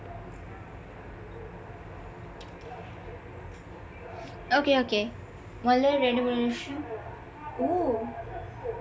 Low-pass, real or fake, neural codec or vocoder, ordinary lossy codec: none; real; none; none